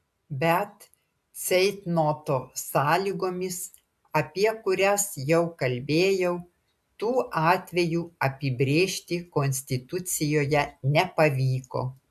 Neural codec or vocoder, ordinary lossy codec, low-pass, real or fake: none; AAC, 96 kbps; 14.4 kHz; real